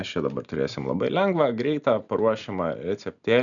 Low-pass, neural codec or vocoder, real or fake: 7.2 kHz; none; real